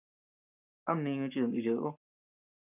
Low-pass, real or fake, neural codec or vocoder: 3.6 kHz; fake; codec, 44.1 kHz, 7.8 kbps, Pupu-Codec